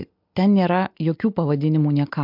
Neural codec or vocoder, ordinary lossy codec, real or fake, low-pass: none; MP3, 48 kbps; real; 5.4 kHz